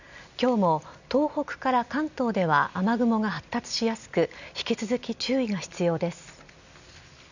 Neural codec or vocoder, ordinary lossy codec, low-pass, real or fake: none; none; 7.2 kHz; real